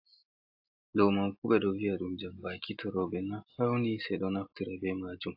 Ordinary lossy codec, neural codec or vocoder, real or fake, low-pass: AAC, 48 kbps; none; real; 5.4 kHz